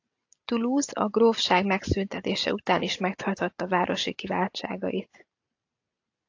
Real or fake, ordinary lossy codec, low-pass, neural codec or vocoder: real; AAC, 48 kbps; 7.2 kHz; none